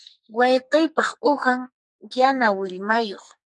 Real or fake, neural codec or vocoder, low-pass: fake; codec, 44.1 kHz, 2.6 kbps, SNAC; 10.8 kHz